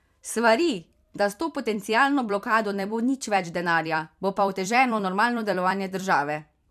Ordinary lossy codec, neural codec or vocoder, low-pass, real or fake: MP3, 96 kbps; vocoder, 44.1 kHz, 128 mel bands every 256 samples, BigVGAN v2; 14.4 kHz; fake